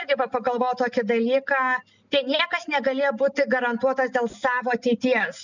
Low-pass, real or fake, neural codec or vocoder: 7.2 kHz; real; none